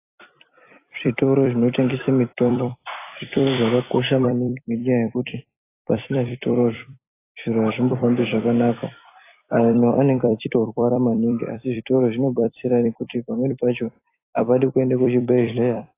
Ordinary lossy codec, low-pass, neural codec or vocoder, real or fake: AAC, 24 kbps; 3.6 kHz; vocoder, 44.1 kHz, 128 mel bands every 256 samples, BigVGAN v2; fake